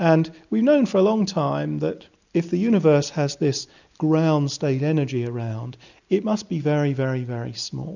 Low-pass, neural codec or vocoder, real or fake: 7.2 kHz; none; real